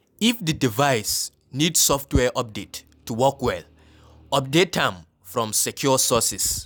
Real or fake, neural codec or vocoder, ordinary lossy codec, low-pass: real; none; none; none